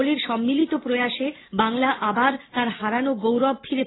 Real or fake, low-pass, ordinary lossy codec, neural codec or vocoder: real; 7.2 kHz; AAC, 16 kbps; none